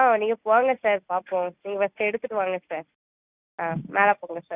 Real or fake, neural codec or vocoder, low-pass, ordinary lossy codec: real; none; 3.6 kHz; Opus, 64 kbps